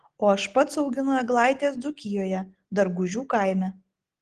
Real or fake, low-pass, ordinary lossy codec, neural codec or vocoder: real; 9.9 kHz; Opus, 16 kbps; none